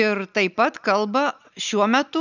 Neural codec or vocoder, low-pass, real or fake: none; 7.2 kHz; real